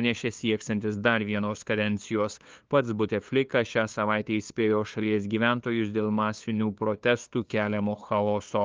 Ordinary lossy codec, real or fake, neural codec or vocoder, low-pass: Opus, 24 kbps; fake; codec, 16 kHz, 2 kbps, FunCodec, trained on LibriTTS, 25 frames a second; 7.2 kHz